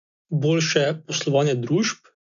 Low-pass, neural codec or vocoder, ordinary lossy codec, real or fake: 7.2 kHz; none; none; real